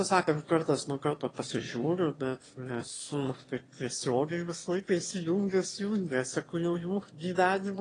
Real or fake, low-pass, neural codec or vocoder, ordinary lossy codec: fake; 9.9 kHz; autoencoder, 22.05 kHz, a latent of 192 numbers a frame, VITS, trained on one speaker; AAC, 32 kbps